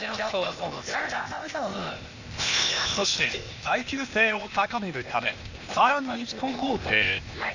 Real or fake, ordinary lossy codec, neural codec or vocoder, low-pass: fake; Opus, 64 kbps; codec, 16 kHz, 0.8 kbps, ZipCodec; 7.2 kHz